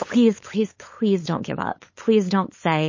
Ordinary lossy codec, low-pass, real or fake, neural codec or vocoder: MP3, 32 kbps; 7.2 kHz; fake; codec, 16 kHz, 8 kbps, FunCodec, trained on LibriTTS, 25 frames a second